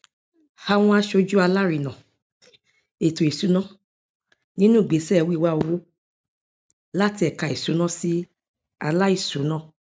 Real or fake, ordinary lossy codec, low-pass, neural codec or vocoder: real; none; none; none